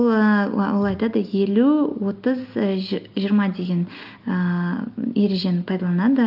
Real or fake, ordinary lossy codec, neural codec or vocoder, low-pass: real; Opus, 24 kbps; none; 5.4 kHz